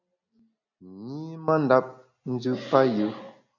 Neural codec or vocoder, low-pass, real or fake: none; 7.2 kHz; real